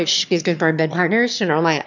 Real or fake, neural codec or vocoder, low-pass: fake; autoencoder, 22.05 kHz, a latent of 192 numbers a frame, VITS, trained on one speaker; 7.2 kHz